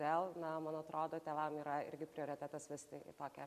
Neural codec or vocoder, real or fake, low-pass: none; real; 14.4 kHz